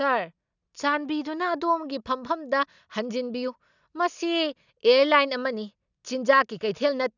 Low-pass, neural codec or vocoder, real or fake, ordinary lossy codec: 7.2 kHz; none; real; none